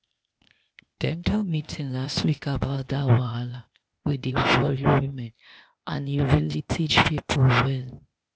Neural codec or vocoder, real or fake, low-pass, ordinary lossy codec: codec, 16 kHz, 0.8 kbps, ZipCodec; fake; none; none